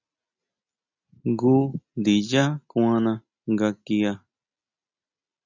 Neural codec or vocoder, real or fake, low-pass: none; real; 7.2 kHz